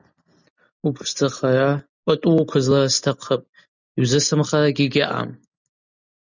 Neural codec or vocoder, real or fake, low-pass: none; real; 7.2 kHz